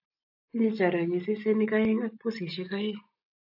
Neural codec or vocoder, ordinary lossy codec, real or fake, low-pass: none; AAC, 48 kbps; real; 5.4 kHz